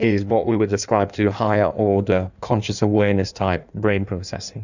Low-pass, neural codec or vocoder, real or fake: 7.2 kHz; codec, 16 kHz in and 24 kHz out, 1.1 kbps, FireRedTTS-2 codec; fake